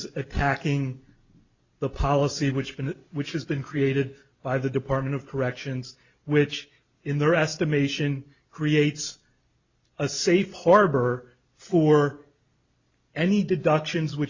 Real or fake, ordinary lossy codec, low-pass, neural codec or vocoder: real; Opus, 64 kbps; 7.2 kHz; none